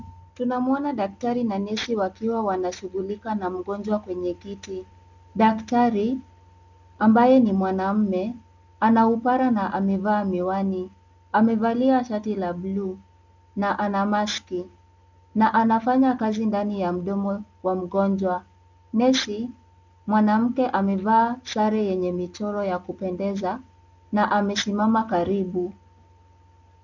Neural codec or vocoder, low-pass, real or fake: none; 7.2 kHz; real